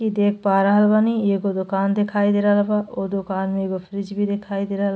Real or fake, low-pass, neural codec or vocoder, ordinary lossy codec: real; none; none; none